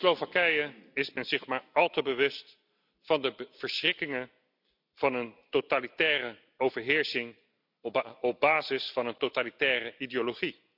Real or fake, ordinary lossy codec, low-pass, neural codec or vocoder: real; none; 5.4 kHz; none